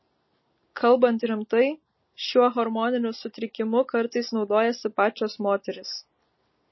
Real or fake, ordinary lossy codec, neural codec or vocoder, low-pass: real; MP3, 24 kbps; none; 7.2 kHz